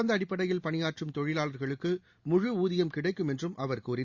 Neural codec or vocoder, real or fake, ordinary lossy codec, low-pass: none; real; Opus, 64 kbps; 7.2 kHz